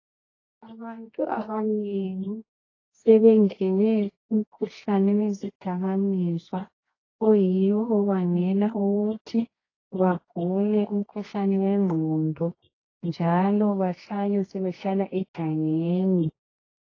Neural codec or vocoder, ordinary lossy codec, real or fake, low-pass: codec, 24 kHz, 0.9 kbps, WavTokenizer, medium music audio release; AAC, 32 kbps; fake; 7.2 kHz